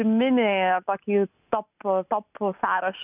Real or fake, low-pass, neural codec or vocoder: real; 3.6 kHz; none